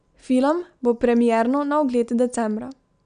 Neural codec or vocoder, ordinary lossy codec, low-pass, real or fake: none; none; 9.9 kHz; real